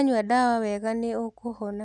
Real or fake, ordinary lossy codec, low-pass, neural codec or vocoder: real; none; 10.8 kHz; none